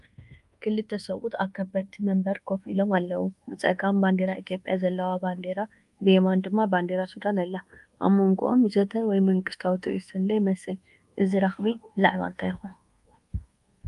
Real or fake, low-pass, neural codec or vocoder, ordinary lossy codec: fake; 10.8 kHz; codec, 24 kHz, 1.2 kbps, DualCodec; Opus, 32 kbps